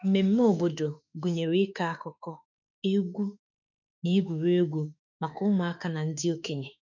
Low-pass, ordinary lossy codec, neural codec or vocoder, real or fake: 7.2 kHz; none; autoencoder, 48 kHz, 32 numbers a frame, DAC-VAE, trained on Japanese speech; fake